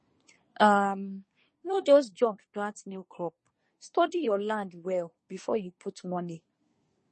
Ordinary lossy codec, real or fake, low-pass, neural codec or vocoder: MP3, 32 kbps; fake; 10.8 kHz; codec, 24 kHz, 1 kbps, SNAC